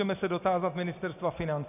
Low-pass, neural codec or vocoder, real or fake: 3.6 kHz; none; real